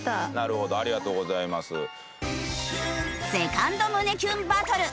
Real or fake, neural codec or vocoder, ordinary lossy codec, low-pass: real; none; none; none